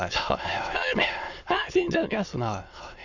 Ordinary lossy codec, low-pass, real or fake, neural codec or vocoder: none; 7.2 kHz; fake; autoencoder, 22.05 kHz, a latent of 192 numbers a frame, VITS, trained on many speakers